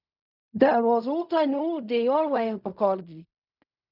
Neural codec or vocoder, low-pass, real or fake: codec, 16 kHz in and 24 kHz out, 0.4 kbps, LongCat-Audio-Codec, fine tuned four codebook decoder; 5.4 kHz; fake